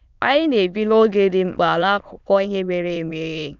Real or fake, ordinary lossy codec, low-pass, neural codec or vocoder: fake; none; 7.2 kHz; autoencoder, 22.05 kHz, a latent of 192 numbers a frame, VITS, trained on many speakers